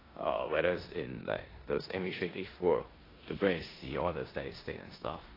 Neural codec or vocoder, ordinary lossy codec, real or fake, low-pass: codec, 16 kHz in and 24 kHz out, 0.9 kbps, LongCat-Audio-Codec, four codebook decoder; AAC, 24 kbps; fake; 5.4 kHz